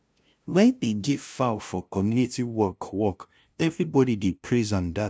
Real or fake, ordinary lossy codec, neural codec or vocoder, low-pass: fake; none; codec, 16 kHz, 0.5 kbps, FunCodec, trained on LibriTTS, 25 frames a second; none